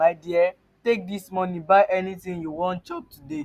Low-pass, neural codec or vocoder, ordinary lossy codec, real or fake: 14.4 kHz; none; Opus, 64 kbps; real